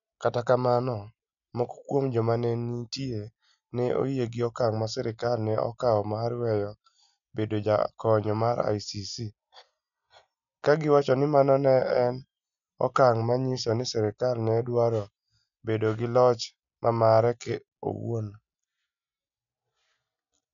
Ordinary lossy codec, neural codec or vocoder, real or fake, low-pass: MP3, 96 kbps; none; real; 7.2 kHz